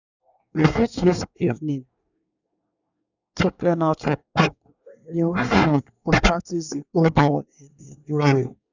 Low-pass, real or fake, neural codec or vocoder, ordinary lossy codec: 7.2 kHz; fake; codec, 24 kHz, 1 kbps, SNAC; none